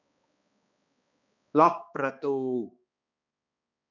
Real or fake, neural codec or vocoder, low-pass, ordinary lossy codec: fake; codec, 16 kHz, 2 kbps, X-Codec, HuBERT features, trained on balanced general audio; 7.2 kHz; none